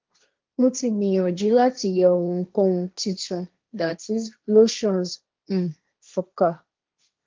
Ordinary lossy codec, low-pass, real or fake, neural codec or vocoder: Opus, 32 kbps; 7.2 kHz; fake; codec, 16 kHz, 1.1 kbps, Voila-Tokenizer